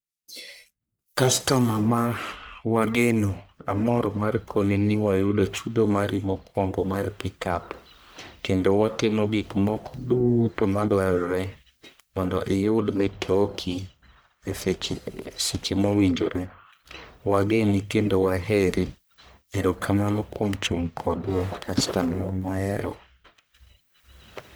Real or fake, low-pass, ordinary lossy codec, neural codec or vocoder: fake; none; none; codec, 44.1 kHz, 1.7 kbps, Pupu-Codec